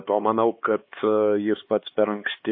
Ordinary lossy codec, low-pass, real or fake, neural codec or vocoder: MP3, 24 kbps; 5.4 kHz; fake; codec, 16 kHz, 4 kbps, X-Codec, HuBERT features, trained on LibriSpeech